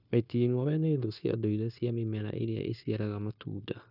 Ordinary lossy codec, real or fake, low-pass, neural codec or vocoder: none; fake; 5.4 kHz; codec, 16 kHz, 0.9 kbps, LongCat-Audio-Codec